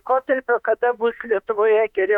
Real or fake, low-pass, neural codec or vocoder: fake; 19.8 kHz; autoencoder, 48 kHz, 32 numbers a frame, DAC-VAE, trained on Japanese speech